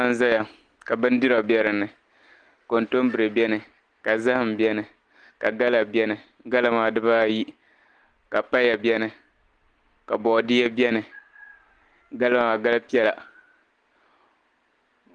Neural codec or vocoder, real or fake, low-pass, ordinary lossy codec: none; real; 9.9 kHz; Opus, 24 kbps